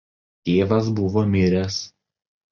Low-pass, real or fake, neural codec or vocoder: 7.2 kHz; real; none